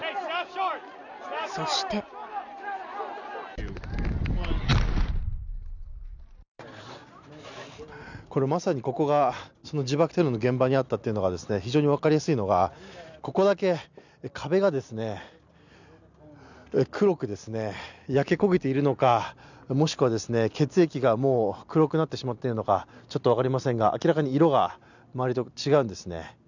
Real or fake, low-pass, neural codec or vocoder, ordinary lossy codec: real; 7.2 kHz; none; none